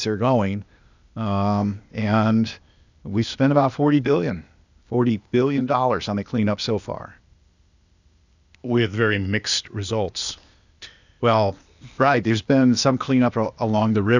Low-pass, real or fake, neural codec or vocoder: 7.2 kHz; fake; codec, 16 kHz, 0.8 kbps, ZipCodec